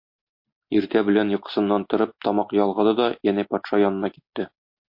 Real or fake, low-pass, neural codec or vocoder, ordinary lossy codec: real; 5.4 kHz; none; MP3, 32 kbps